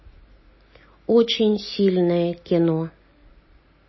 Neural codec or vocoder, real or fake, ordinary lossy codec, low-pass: none; real; MP3, 24 kbps; 7.2 kHz